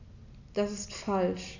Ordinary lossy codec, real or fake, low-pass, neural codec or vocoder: none; real; 7.2 kHz; none